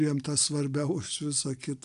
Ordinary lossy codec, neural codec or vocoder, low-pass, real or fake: MP3, 96 kbps; none; 10.8 kHz; real